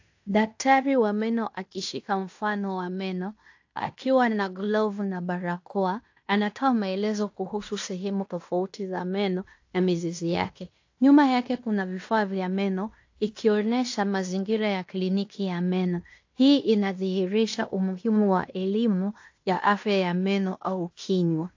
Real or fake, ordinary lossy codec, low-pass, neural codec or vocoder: fake; AAC, 48 kbps; 7.2 kHz; codec, 16 kHz in and 24 kHz out, 0.9 kbps, LongCat-Audio-Codec, fine tuned four codebook decoder